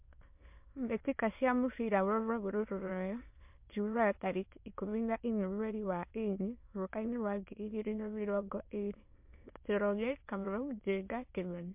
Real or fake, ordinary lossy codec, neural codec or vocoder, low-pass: fake; MP3, 32 kbps; autoencoder, 22.05 kHz, a latent of 192 numbers a frame, VITS, trained on many speakers; 3.6 kHz